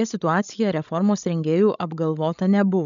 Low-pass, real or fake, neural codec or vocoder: 7.2 kHz; fake; codec, 16 kHz, 16 kbps, FreqCodec, larger model